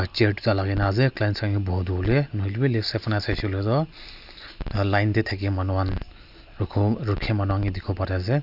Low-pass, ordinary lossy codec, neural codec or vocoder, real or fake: 5.4 kHz; none; none; real